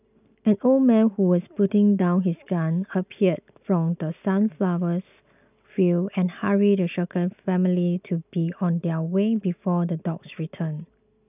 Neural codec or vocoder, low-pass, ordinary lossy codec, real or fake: none; 3.6 kHz; none; real